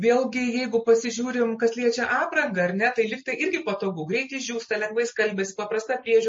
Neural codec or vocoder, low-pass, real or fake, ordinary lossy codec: none; 10.8 kHz; real; MP3, 32 kbps